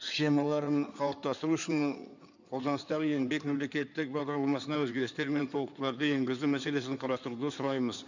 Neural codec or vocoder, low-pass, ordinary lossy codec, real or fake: codec, 16 kHz in and 24 kHz out, 2.2 kbps, FireRedTTS-2 codec; 7.2 kHz; none; fake